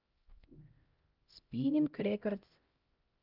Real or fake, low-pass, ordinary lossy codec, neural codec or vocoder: fake; 5.4 kHz; Opus, 24 kbps; codec, 16 kHz, 0.5 kbps, X-Codec, HuBERT features, trained on LibriSpeech